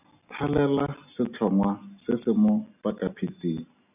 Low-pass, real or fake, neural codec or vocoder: 3.6 kHz; real; none